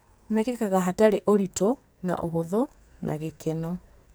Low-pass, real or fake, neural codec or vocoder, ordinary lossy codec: none; fake; codec, 44.1 kHz, 2.6 kbps, SNAC; none